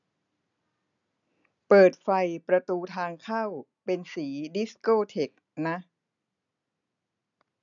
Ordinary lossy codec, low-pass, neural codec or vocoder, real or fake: none; 7.2 kHz; none; real